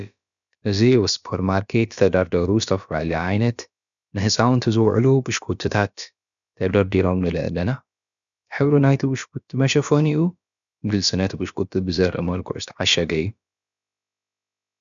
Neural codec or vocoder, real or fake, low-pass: codec, 16 kHz, about 1 kbps, DyCAST, with the encoder's durations; fake; 7.2 kHz